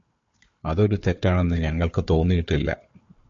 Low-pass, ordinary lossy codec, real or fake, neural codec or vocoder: 7.2 kHz; MP3, 48 kbps; fake; codec, 16 kHz, 4 kbps, FunCodec, trained on Chinese and English, 50 frames a second